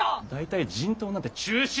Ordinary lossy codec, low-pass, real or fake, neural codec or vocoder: none; none; real; none